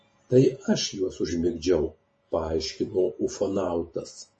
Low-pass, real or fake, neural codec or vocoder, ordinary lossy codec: 10.8 kHz; fake; vocoder, 48 kHz, 128 mel bands, Vocos; MP3, 32 kbps